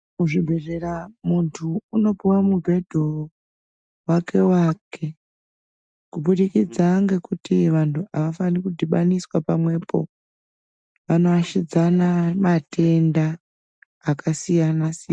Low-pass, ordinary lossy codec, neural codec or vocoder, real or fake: 9.9 kHz; MP3, 96 kbps; none; real